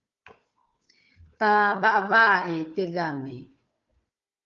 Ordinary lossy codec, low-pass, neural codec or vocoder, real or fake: Opus, 32 kbps; 7.2 kHz; codec, 16 kHz, 4 kbps, FunCodec, trained on Chinese and English, 50 frames a second; fake